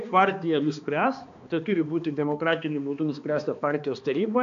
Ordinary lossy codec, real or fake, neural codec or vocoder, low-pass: AAC, 64 kbps; fake; codec, 16 kHz, 2 kbps, X-Codec, HuBERT features, trained on balanced general audio; 7.2 kHz